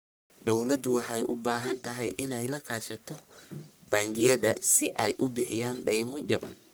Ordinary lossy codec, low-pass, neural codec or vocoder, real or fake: none; none; codec, 44.1 kHz, 1.7 kbps, Pupu-Codec; fake